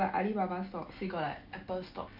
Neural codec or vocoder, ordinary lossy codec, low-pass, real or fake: none; MP3, 48 kbps; 5.4 kHz; real